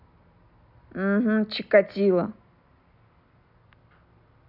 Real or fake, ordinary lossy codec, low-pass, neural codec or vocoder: real; none; 5.4 kHz; none